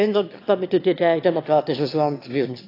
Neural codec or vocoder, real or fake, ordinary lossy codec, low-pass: autoencoder, 22.05 kHz, a latent of 192 numbers a frame, VITS, trained on one speaker; fake; AAC, 32 kbps; 5.4 kHz